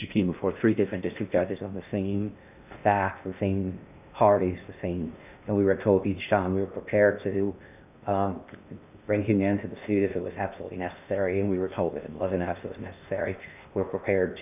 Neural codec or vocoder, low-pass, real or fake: codec, 16 kHz in and 24 kHz out, 0.6 kbps, FocalCodec, streaming, 2048 codes; 3.6 kHz; fake